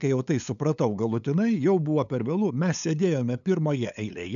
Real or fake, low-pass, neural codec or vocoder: fake; 7.2 kHz; codec, 16 kHz, 8 kbps, FunCodec, trained on LibriTTS, 25 frames a second